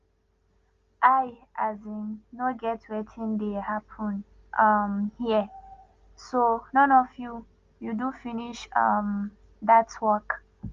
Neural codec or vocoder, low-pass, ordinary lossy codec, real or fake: none; 7.2 kHz; Opus, 32 kbps; real